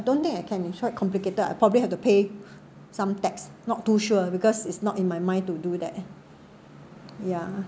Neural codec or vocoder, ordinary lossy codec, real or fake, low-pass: none; none; real; none